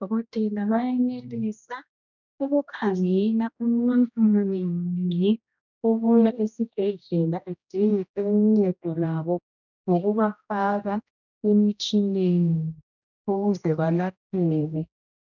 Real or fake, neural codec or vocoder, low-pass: fake; codec, 16 kHz, 1 kbps, X-Codec, HuBERT features, trained on general audio; 7.2 kHz